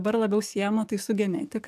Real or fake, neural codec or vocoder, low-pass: fake; codec, 44.1 kHz, 7.8 kbps, DAC; 14.4 kHz